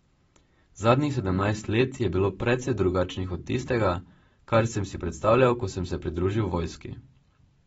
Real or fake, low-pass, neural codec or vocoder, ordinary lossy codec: real; 19.8 kHz; none; AAC, 24 kbps